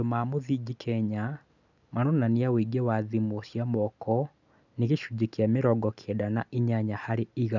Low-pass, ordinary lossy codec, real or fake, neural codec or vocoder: 7.2 kHz; none; real; none